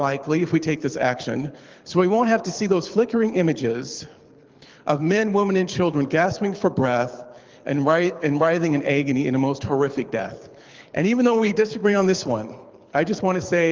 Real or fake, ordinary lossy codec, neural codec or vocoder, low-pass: fake; Opus, 32 kbps; codec, 24 kHz, 6 kbps, HILCodec; 7.2 kHz